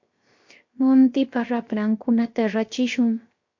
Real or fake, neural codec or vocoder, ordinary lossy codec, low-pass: fake; codec, 16 kHz, 0.7 kbps, FocalCodec; MP3, 48 kbps; 7.2 kHz